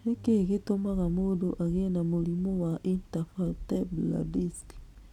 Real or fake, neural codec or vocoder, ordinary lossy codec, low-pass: real; none; none; 19.8 kHz